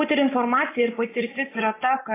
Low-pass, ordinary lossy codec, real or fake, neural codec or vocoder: 3.6 kHz; AAC, 16 kbps; real; none